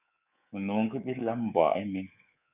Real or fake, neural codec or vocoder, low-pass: fake; codec, 24 kHz, 3.1 kbps, DualCodec; 3.6 kHz